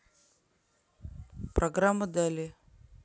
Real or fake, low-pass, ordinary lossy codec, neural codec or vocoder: real; none; none; none